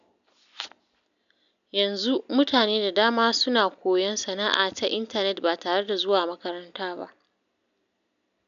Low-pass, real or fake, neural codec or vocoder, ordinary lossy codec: 7.2 kHz; real; none; none